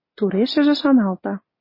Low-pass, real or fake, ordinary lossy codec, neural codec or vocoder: 5.4 kHz; real; MP3, 24 kbps; none